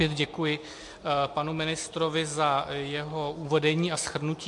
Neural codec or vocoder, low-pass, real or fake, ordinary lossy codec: none; 10.8 kHz; real; MP3, 48 kbps